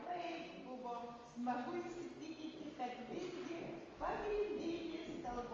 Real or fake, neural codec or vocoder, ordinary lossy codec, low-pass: real; none; Opus, 32 kbps; 7.2 kHz